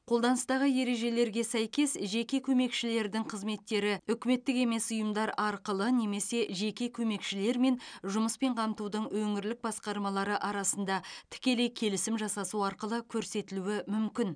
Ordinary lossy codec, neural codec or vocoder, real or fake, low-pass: none; none; real; 9.9 kHz